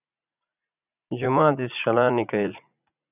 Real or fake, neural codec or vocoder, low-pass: fake; vocoder, 44.1 kHz, 80 mel bands, Vocos; 3.6 kHz